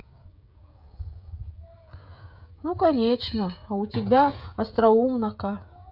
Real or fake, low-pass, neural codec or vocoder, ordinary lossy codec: fake; 5.4 kHz; codec, 16 kHz, 16 kbps, FreqCodec, smaller model; none